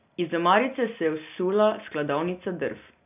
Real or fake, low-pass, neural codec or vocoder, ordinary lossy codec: real; 3.6 kHz; none; none